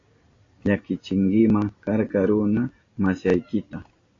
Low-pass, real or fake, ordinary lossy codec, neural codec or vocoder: 7.2 kHz; real; AAC, 32 kbps; none